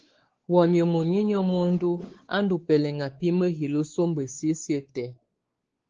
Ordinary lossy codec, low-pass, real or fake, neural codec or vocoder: Opus, 16 kbps; 7.2 kHz; fake; codec, 16 kHz, 4 kbps, X-Codec, WavLM features, trained on Multilingual LibriSpeech